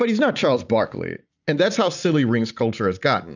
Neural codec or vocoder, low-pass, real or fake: none; 7.2 kHz; real